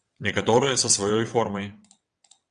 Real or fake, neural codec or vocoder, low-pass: fake; vocoder, 22.05 kHz, 80 mel bands, WaveNeXt; 9.9 kHz